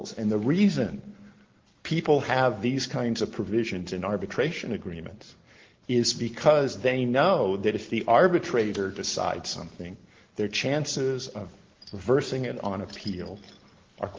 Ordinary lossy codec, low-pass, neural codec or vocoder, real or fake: Opus, 16 kbps; 7.2 kHz; none; real